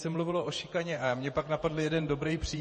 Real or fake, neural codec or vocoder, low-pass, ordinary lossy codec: fake; vocoder, 48 kHz, 128 mel bands, Vocos; 10.8 kHz; MP3, 32 kbps